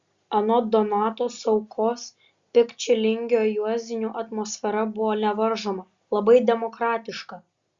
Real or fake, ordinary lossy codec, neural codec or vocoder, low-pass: real; Opus, 64 kbps; none; 7.2 kHz